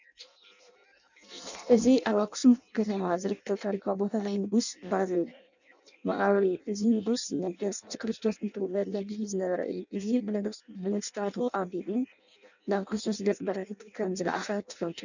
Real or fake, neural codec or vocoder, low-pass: fake; codec, 16 kHz in and 24 kHz out, 0.6 kbps, FireRedTTS-2 codec; 7.2 kHz